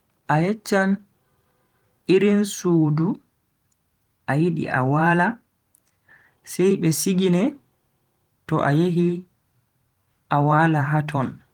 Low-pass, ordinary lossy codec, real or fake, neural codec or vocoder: 19.8 kHz; Opus, 24 kbps; fake; vocoder, 44.1 kHz, 128 mel bands every 512 samples, BigVGAN v2